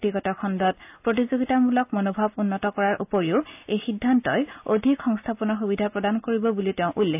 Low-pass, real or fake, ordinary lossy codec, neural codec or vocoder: 3.6 kHz; real; AAC, 32 kbps; none